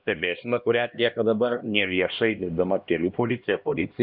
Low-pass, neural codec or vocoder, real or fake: 5.4 kHz; codec, 16 kHz, 1 kbps, X-Codec, HuBERT features, trained on LibriSpeech; fake